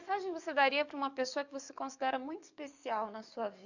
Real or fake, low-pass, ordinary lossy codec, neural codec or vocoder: fake; 7.2 kHz; none; codec, 44.1 kHz, 7.8 kbps, DAC